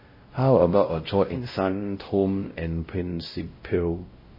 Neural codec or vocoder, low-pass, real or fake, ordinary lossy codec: codec, 16 kHz, 0.5 kbps, X-Codec, WavLM features, trained on Multilingual LibriSpeech; 5.4 kHz; fake; MP3, 24 kbps